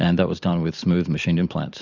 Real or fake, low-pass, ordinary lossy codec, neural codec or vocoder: fake; 7.2 kHz; Opus, 64 kbps; vocoder, 44.1 kHz, 128 mel bands every 256 samples, BigVGAN v2